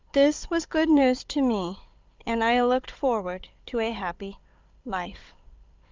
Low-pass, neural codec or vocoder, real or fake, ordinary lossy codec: 7.2 kHz; codec, 16 kHz, 16 kbps, FunCodec, trained on Chinese and English, 50 frames a second; fake; Opus, 24 kbps